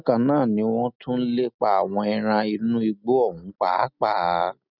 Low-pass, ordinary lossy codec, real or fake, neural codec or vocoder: 5.4 kHz; none; real; none